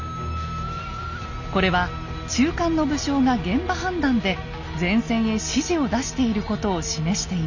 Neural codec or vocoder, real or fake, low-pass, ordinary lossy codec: none; real; 7.2 kHz; none